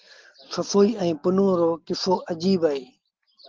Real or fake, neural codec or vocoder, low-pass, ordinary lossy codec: real; none; 7.2 kHz; Opus, 16 kbps